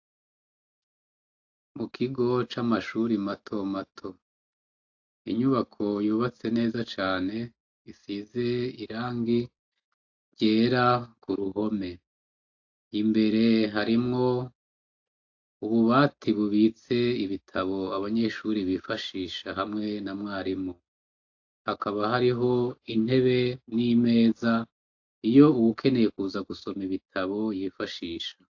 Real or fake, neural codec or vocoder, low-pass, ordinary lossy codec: real; none; 7.2 kHz; AAC, 48 kbps